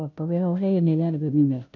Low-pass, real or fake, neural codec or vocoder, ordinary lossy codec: 7.2 kHz; fake; codec, 16 kHz, 0.5 kbps, FunCodec, trained on Chinese and English, 25 frames a second; none